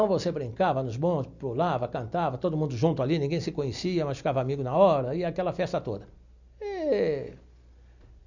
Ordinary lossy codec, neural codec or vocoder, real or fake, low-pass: none; none; real; 7.2 kHz